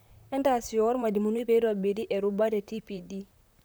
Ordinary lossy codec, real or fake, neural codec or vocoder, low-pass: none; fake; vocoder, 44.1 kHz, 128 mel bands, Pupu-Vocoder; none